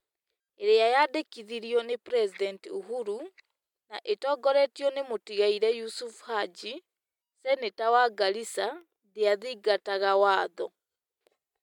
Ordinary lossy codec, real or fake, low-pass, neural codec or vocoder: MP3, 96 kbps; real; 19.8 kHz; none